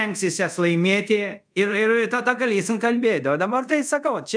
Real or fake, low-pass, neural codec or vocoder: fake; 9.9 kHz; codec, 24 kHz, 0.5 kbps, DualCodec